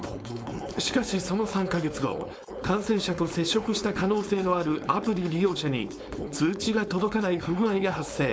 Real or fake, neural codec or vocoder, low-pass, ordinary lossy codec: fake; codec, 16 kHz, 4.8 kbps, FACodec; none; none